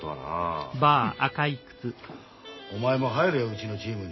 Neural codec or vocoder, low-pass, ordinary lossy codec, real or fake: none; 7.2 kHz; MP3, 24 kbps; real